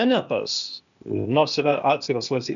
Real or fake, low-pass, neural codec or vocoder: fake; 7.2 kHz; codec, 16 kHz, 0.8 kbps, ZipCodec